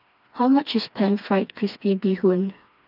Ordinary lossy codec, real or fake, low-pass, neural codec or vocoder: none; fake; 5.4 kHz; codec, 16 kHz, 2 kbps, FreqCodec, smaller model